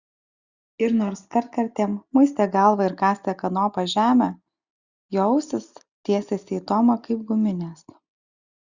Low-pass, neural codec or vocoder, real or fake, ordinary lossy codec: 7.2 kHz; none; real; Opus, 64 kbps